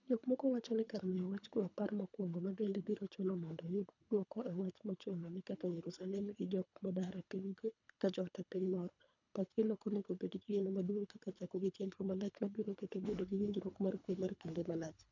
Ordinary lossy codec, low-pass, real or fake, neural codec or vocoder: none; 7.2 kHz; fake; codec, 24 kHz, 3 kbps, HILCodec